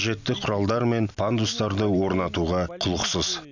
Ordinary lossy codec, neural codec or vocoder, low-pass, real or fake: none; none; 7.2 kHz; real